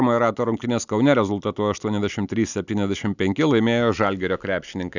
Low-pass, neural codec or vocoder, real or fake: 7.2 kHz; none; real